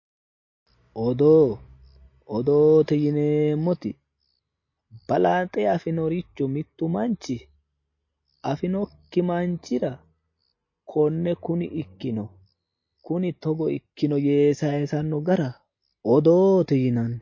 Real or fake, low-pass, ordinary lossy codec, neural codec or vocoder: real; 7.2 kHz; MP3, 32 kbps; none